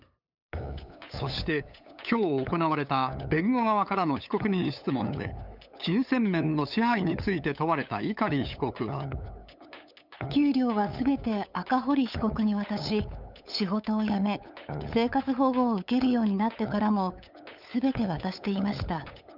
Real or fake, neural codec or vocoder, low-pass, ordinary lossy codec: fake; codec, 16 kHz, 8 kbps, FunCodec, trained on LibriTTS, 25 frames a second; 5.4 kHz; none